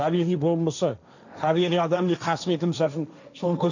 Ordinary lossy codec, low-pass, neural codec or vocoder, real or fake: none; 7.2 kHz; codec, 16 kHz, 1.1 kbps, Voila-Tokenizer; fake